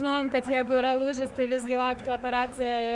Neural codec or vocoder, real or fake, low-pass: codec, 24 kHz, 1 kbps, SNAC; fake; 10.8 kHz